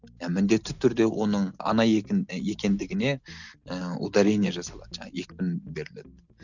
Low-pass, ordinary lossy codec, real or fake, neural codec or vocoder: 7.2 kHz; none; real; none